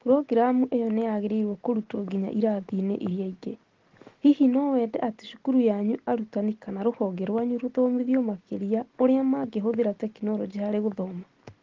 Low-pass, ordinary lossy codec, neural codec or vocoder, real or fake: 7.2 kHz; Opus, 16 kbps; none; real